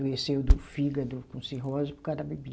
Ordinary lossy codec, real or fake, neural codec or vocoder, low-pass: none; real; none; none